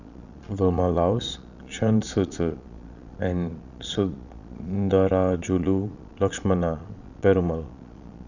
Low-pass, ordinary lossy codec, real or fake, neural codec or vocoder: 7.2 kHz; none; fake; vocoder, 22.05 kHz, 80 mel bands, Vocos